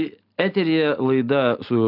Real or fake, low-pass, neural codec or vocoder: real; 5.4 kHz; none